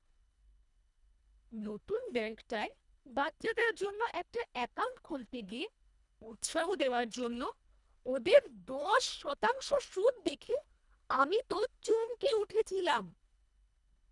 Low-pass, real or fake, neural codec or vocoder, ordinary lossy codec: 10.8 kHz; fake; codec, 24 kHz, 1.5 kbps, HILCodec; none